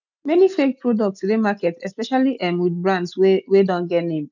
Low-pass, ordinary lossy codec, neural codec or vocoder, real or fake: 7.2 kHz; none; none; real